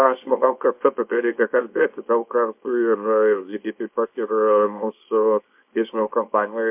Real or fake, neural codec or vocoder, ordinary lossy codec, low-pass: fake; codec, 24 kHz, 0.9 kbps, WavTokenizer, small release; MP3, 32 kbps; 3.6 kHz